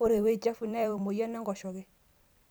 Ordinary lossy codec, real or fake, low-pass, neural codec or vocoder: none; fake; none; vocoder, 44.1 kHz, 128 mel bands every 256 samples, BigVGAN v2